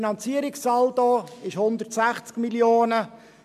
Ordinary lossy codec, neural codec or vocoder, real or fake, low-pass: MP3, 96 kbps; none; real; 14.4 kHz